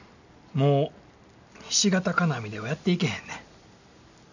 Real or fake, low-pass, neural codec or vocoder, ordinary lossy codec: real; 7.2 kHz; none; none